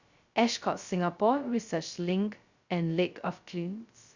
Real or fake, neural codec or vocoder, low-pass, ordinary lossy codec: fake; codec, 16 kHz, 0.2 kbps, FocalCodec; 7.2 kHz; Opus, 64 kbps